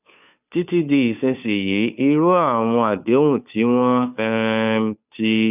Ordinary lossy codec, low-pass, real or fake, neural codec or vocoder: none; 3.6 kHz; fake; codec, 16 kHz, 2 kbps, FunCodec, trained on Chinese and English, 25 frames a second